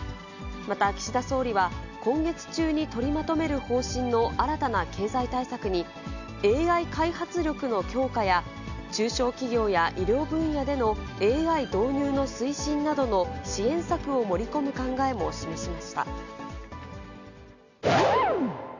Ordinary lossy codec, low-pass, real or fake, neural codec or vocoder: none; 7.2 kHz; real; none